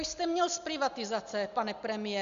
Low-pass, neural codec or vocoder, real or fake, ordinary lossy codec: 7.2 kHz; none; real; Opus, 64 kbps